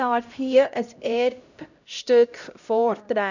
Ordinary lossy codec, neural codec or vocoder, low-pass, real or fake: none; codec, 16 kHz, 0.5 kbps, X-Codec, HuBERT features, trained on LibriSpeech; 7.2 kHz; fake